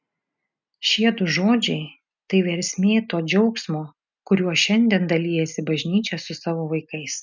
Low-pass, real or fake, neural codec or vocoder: 7.2 kHz; real; none